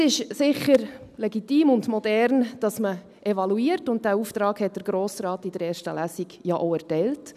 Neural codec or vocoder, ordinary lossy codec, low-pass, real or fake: none; none; 14.4 kHz; real